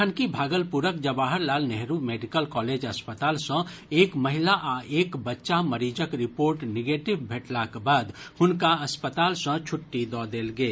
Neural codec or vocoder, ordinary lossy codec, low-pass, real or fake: none; none; none; real